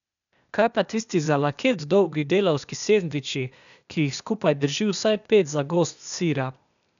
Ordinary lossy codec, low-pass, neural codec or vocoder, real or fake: none; 7.2 kHz; codec, 16 kHz, 0.8 kbps, ZipCodec; fake